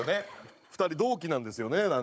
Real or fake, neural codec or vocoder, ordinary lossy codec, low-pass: fake; codec, 16 kHz, 16 kbps, FunCodec, trained on Chinese and English, 50 frames a second; none; none